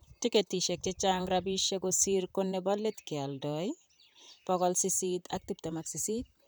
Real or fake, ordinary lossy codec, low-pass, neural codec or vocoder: fake; none; none; vocoder, 44.1 kHz, 128 mel bands, Pupu-Vocoder